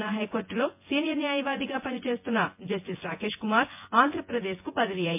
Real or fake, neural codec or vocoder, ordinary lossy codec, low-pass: fake; vocoder, 24 kHz, 100 mel bands, Vocos; MP3, 32 kbps; 3.6 kHz